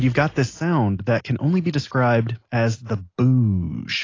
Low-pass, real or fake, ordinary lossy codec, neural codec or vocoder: 7.2 kHz; real; AAC, 32 kbps; none